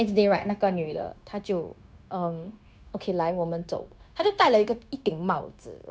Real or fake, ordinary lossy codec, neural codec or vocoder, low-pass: fake; none; codec, 16 kHz, 0.9 kbps, LongCat-Audio-Codec; none